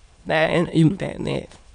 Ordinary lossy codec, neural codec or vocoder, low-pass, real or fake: none; autoencoder, 22.05 kHz, a latent of 192 numbers a frame, VITS, trained on many speakers; 9.9 kHz; fake